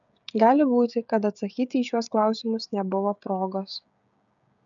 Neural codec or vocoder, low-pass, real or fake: codec, 16 kHz, 16 kbps, FreqCodec, smaller model; 7.2 kHz; fake